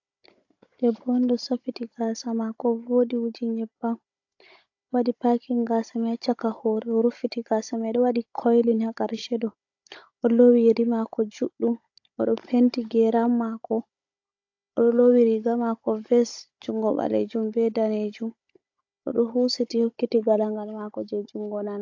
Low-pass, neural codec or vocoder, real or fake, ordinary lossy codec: 7.2 kHz; codec, 16 kHz, 16 kbps, FunCodec, trained on Chinese and English, 50 frames a second; fake; AAC, 48 kbps